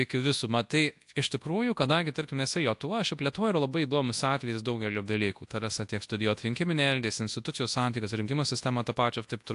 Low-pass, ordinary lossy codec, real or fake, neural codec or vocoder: 10.8 kHz; AAC, 64 kbps; fake; codec, 24 kHz, 0.9 kbps, WavTokenizer, large speech release